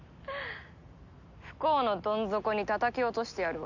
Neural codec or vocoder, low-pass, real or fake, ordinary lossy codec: none; 7.2 kHz; real; none